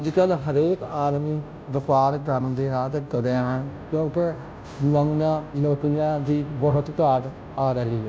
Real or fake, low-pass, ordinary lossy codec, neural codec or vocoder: fake; none; none; codec, 16 kHz, 0.5 kbps, FunCodec, trained on Chinese and English, 25 frames a second